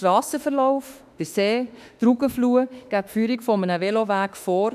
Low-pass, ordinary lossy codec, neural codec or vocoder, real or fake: 14.4 kHz; none; autoencoder, 48 kHz, 32 numbers a frame, DAC-VAE, trained on Japanese speech; fake